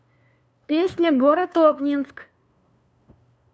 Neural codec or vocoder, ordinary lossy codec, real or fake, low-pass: codec, 16 kHz, 2 kbps, FunCodec, trained on LibriTTS, 25 frames a second; none; fake; none